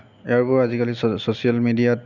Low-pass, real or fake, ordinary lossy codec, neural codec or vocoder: 7.2 kHz; real; none; none